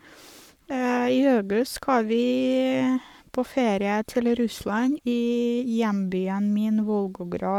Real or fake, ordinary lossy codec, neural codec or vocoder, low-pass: fake; none; codec, 44.1 kHz, 7.8 kbps, Pupu-Codec; 19.8 kHz